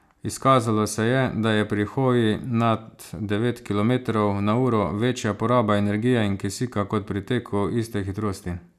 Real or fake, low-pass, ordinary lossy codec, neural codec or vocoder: real; 14.4 kHz; none; none